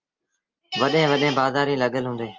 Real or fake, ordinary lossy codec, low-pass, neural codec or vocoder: real; Opus, 24 kbps; 7.2 kHz; none